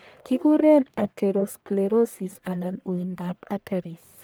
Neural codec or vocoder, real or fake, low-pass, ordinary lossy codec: codec, 44.1 kHz, 1.7 kbps, Pupu-Codec; fake; none; none